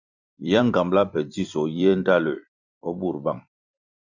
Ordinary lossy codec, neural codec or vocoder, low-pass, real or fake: Opus, 64 kbps; vocoder, 44.1 kHz, 128 mel bands every 256 samples, BigVGAN v2; 7.2 kHz; fake